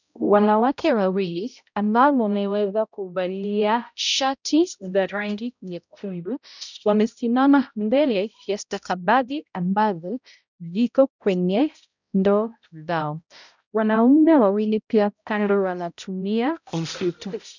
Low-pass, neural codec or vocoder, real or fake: 7.2 kHz; codec, 16 kHz, 0.5 kbps, X-Codec, HuBERT features, trained on balanced general audio; fake